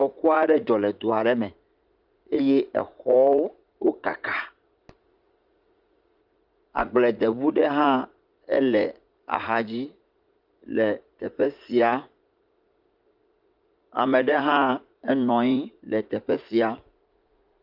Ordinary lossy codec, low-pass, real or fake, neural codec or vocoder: Opus, 24 kbps; 5.4 kHz; fake; vocoder, 44.1 kHz, 80 mel bands, Vocos